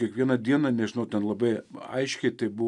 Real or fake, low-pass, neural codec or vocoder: real; 10.8 kHz; none